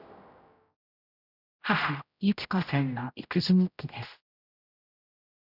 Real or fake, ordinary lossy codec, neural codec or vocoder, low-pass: fake; none; codec, 16 kHz, 0.5 kbps, X-Codec, HuBERT features, trained on general audio; 5.4 kHz